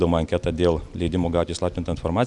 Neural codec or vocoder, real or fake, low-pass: none; real; 10.8 kHz